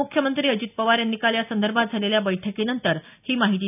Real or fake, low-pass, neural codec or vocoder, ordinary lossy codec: real; 3.6 kHz; none; none